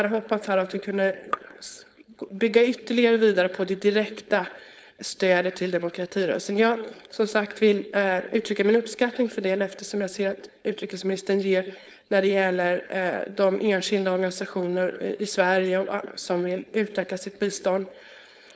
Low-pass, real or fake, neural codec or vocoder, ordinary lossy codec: none; fake; codec, 16 kHz, 4.8 kbps, FACodec; none